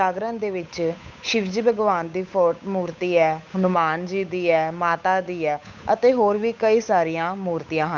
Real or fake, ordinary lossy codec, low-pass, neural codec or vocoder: fake; MP3, 64 kbps; 7.2 kHz; codec, 16 kHz, 8 kbps, FunCodec, trained on Chinese and English, 25 frames a second